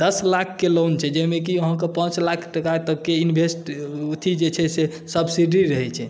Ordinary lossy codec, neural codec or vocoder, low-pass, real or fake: none; none; none; real